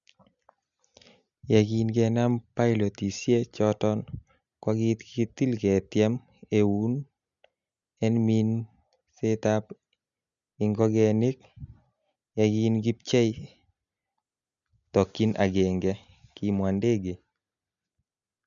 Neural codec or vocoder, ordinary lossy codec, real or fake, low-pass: none; none; real; 7.2 kHz